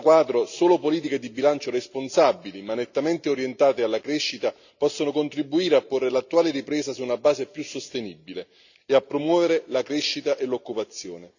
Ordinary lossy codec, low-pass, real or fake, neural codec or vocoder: none; 7.2 kHz; real; none